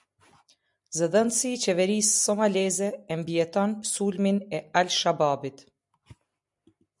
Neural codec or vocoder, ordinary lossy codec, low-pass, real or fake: none; MP3, 96 kbps; 10.8 kHz; real